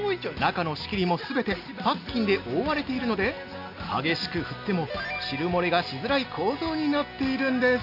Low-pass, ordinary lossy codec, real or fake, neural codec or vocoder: 5.4 kHz; none; real; none